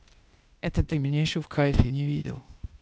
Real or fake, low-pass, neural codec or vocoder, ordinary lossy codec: fake; none; codec, 16 kHz, 0.8 kbps, ZipCodec; none